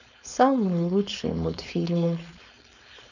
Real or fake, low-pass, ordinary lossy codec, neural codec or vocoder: fake; 7.2 kHz; none; codec, 16 kHz, 4.8 kbps, FACodec